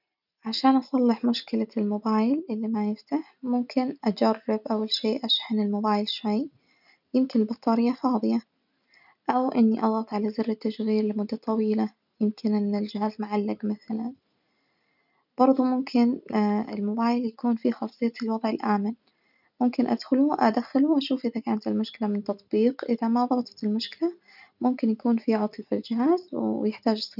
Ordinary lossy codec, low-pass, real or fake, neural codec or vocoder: none; 5.4 kHz; real; none